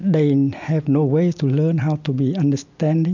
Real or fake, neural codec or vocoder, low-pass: real; none; 7.2 kHz